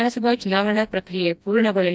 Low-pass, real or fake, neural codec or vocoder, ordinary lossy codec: none; fake; codec, 16 kHz, 1 kbps, FreqCodec, smaller model; none